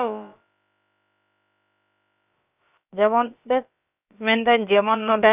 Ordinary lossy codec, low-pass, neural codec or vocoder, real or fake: none; 3.6 kHz; codec, 16 kHz, about 1 kbps, DyCAST, with the encoder's durations; fake